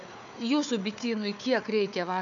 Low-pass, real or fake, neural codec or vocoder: 7.2 kHz; fake; codec, 16 kHz, 4 kbps, FunCodec, trained on Chinese and English, 50 frames a second